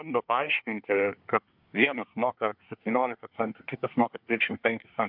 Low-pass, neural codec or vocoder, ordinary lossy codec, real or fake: 5.4 kHz; codec, 24 kHz, 1 kbps, SNAC; MP3, 48 kbps; fake